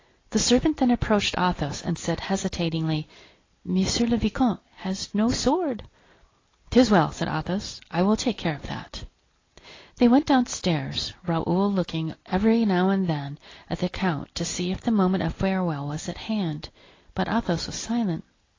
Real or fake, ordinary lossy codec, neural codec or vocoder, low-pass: real; AAC, 32 kbps; none; 7.2 kHz